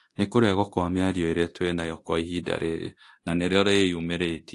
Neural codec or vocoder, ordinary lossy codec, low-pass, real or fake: codec, 24 kHz, 0.9 kbps, DualCodec; AAC, 48 kbps; 10.8 kHz; fake